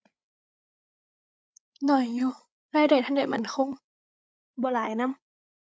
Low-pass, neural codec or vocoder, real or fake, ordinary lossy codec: none; codec, 16 kHz, 8 kbps, FreqCodec, larger model; fake; none